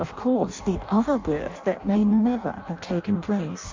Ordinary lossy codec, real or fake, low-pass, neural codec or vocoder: MP3, 48 kbps; fake; 7.2 kHz; codec, 16 kHz in and 24 kHz out, 0.6 kbps, FireRedTTS-2 codec